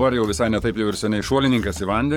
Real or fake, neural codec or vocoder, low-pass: fake; codec, 44.1 kHz, 7.8 kbps, Pupu-Codec; 19.8 kHz